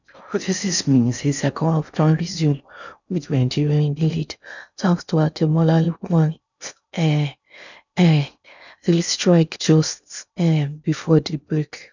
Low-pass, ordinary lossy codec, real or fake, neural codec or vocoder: 7.2 kHz; none; fake; codec, 16 kHz in and 24 kHz out, 0.6 kbps, FocalCodec, streaming, 4096 codes